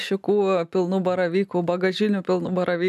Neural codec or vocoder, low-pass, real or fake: none; 14.4 kHz; real